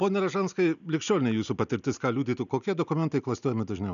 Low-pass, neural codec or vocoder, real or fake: 7.2 kHz; none; real